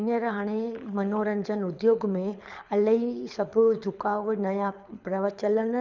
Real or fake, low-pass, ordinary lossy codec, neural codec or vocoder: fake; 7.2 kHz; none; codec, 24 kHz, 6 kbps, HILCodec